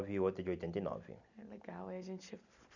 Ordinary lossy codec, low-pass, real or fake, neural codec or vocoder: none; 7.2 kHz; real; none